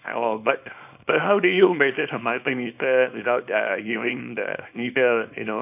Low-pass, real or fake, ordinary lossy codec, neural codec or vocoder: 3.6 kHz; fake; none; codec, 24 kHz, 0.9 kbps, WavTokenizer, small release